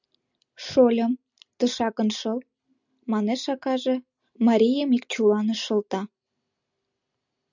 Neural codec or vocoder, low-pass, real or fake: none; 7.2 kHz; real